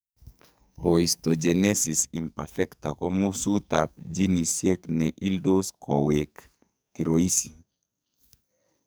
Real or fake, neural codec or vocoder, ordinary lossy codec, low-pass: fake; codec, 44.1 kHz, 2.6 kbps, SNAC; none; none